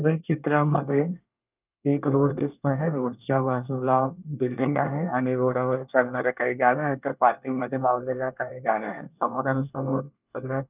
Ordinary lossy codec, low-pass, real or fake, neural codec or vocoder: none; 3.6 kHz; fake; codec, 24 kHz, 1 kbps, SNAC